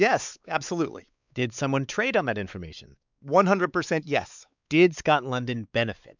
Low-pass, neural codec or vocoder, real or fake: 7.2 kHz; codec, 16 kHz, 4 kbps, X-Codec, WavLM features, trained on Multilingual LibriSpeech; fake